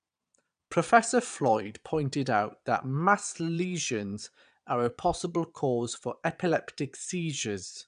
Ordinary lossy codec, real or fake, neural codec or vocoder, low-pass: none; fake; vocoder, 22.05 kHz, 80 mel bands, Vocos; 9.9 kHz